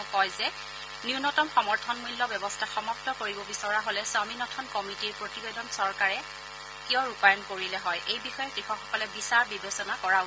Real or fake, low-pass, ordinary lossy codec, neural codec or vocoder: real; none; none; none